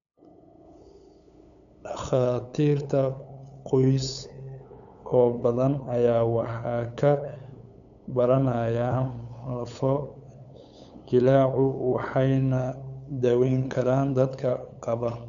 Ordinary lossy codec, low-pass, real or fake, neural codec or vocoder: none; 7.2 kHz; fake; codec, 16 kHz, 8 kbps, FunCodec, trained on LibriTTS, 25 frames a second